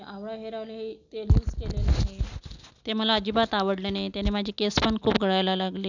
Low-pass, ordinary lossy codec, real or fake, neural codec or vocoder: 7.2 kHz; none; real; none